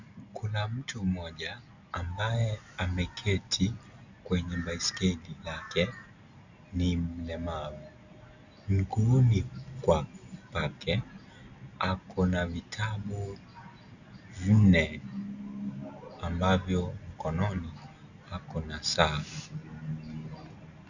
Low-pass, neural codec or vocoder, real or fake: 7.2 kHz; none; real